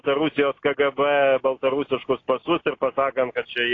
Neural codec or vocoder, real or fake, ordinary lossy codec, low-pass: none; real; AAC, 32 kbps; 7.2 kHz